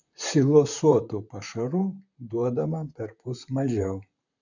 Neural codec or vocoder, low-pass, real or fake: vocoder, 44.1 kHz, 128 mel bands, Pupu-Vocoder; 7.2 kHz; fake